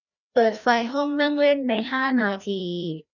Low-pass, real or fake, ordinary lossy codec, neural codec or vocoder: 7.2 kHz; fake; none; codec, 16 kHz, 1 kbps, FreqCodec, larger model